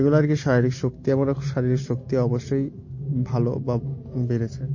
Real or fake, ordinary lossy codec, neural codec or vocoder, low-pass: real; MP3, 32 kbps; none; 7.2 kHz